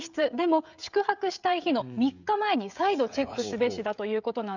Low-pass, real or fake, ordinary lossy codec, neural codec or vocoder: 7.2 kHz; fake; none; codec, 16 kHz, 16 kbps, FreqCodec, smaller model